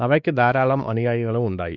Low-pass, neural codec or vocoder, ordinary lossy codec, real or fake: 7.2 kHz; codec, 16 kHz, 2 kbps, X-Codec, WavLM features, trained on Multilingual LibriSpeech; Opus, 64 kbps; fake